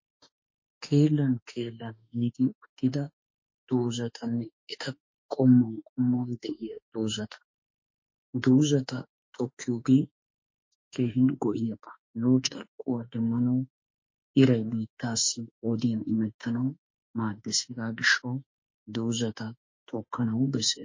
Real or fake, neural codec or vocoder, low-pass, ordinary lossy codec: fake; autoencoder, 48 kHz, 32 numbers a frame, DAC-VAE, trained on Japanese speech; 7.2 kHz; MP3, 32 kbps